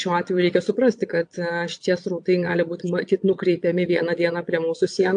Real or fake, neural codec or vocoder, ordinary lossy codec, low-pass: fake; vocoder, 22.05 kHz, 80 mel bands, Vocos; AAC, 64 kbps; 9.9 kHz